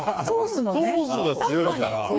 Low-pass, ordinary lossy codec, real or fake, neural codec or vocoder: none; none; fake; codec, 16 kHz, 4 kbps, FreqCodec, smaller model